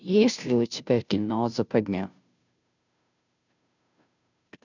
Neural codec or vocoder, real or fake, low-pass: codec, 16 kHz, 0.5 kbps, FunCodec, trained on Chinese and English, 25 frames a second; fake; 7.2 kHz